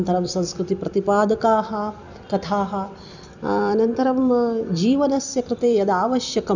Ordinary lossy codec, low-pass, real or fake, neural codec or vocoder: none; 7.2 kHz; fake; vocoder, 44.1 kHz, 128 mel bands every 256 samples, BigVGAN v2